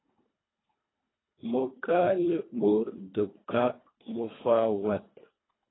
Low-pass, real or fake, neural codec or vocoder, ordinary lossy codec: 7.2 kHz; fake; codec, 24 kHz, 1.5 kbps, HILCodec; AAC, 16 kbps